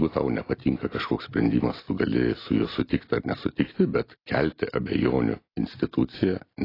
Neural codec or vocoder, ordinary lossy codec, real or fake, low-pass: none; AAC, 24 kbps; real; 5.4 kHz